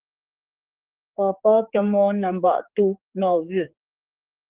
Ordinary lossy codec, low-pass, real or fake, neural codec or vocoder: Opus, 16 kbps; 3.6 kHz; fake; codec, 16 kHz, 4 kbps, X-Codec, HuBERT features, trained on general audio